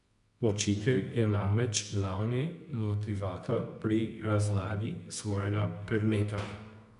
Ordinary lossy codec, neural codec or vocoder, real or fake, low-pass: none; codec, 24 kHz, 0.9 kbps, WavTokenizer, medium music audio release; fake; 10.8 kHz